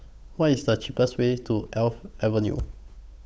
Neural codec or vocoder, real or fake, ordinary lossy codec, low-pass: codec, 16 kHz, 8 kbps, FunCodec, trained on Chinese and English, 25 frames a second; fake; none; none